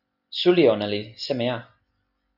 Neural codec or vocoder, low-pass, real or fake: none; 5.4 kHz; real